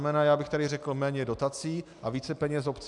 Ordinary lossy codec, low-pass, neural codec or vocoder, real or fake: MP3, 96 kbps; 10.8 kHz; none; real